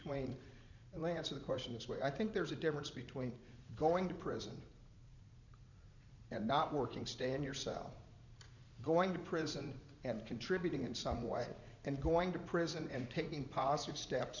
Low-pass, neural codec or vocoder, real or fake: 7.2 kHz; vocoder, 44.1 kHz, 80 mel bands, Vocos; fake